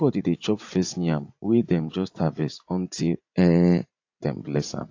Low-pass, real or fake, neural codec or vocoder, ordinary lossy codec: 7.2 kHz; fake; vocoder, 44.1 kHz, 80 mel bands, Vocos; AAC, 48 kbps